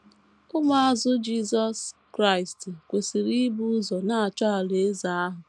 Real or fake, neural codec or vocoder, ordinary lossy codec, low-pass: real; none; none; none